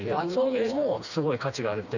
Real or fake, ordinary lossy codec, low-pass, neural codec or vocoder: fake; none; 7.2 kHz; codec, 16 kHz, 2 kbps, FreqCodec, smaller model